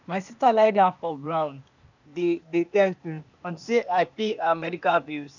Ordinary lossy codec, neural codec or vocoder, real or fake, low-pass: none; codec, 16 kHz, 0.8 kbps, ZipCodec; fake; 7.2 kHz